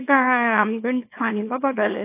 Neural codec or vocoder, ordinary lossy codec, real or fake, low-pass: codec, 24 kHz, 0.9 kbps, WavTokenizer, small release; AAC, 24 kbps; fake; 3.6 kHz